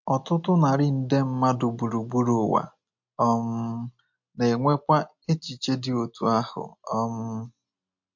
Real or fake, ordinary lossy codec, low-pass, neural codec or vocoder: real; MP3, 48 kbps; 7.2 kHz; none